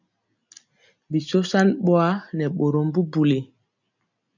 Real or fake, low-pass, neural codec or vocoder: real; 7.2 kHz; none